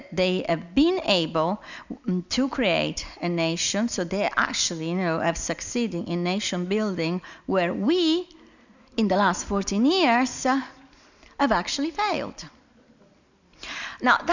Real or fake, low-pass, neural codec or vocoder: real; 7.2 kHz; none